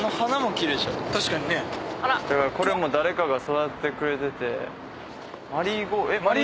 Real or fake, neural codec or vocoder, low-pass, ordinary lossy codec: real; none; none; none